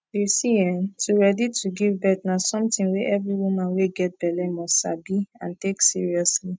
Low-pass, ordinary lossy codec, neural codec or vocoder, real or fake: none; none; none; real